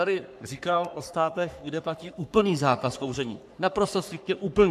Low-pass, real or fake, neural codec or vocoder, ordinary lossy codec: 14.4 kHz; fake; codec, 44.1 kHz, 3.4 kbps, Pupu-Codec; MP3, 96 kbps